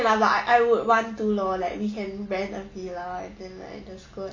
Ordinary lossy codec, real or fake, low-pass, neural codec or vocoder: MP3, 64 kbps; real; 7.2 kHz; none